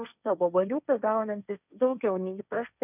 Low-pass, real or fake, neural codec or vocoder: 3.6 kHz; fake; codec, 16 kHz, 1.1 kbps, Voila-Tokenizer